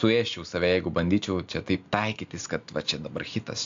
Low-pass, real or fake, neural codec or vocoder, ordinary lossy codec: 7.2 kHz; real; none; AAC, 64 kbps